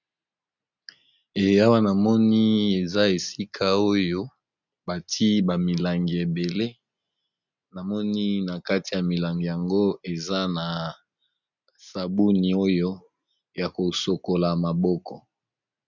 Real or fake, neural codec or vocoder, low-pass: real; none; 7.2 kHz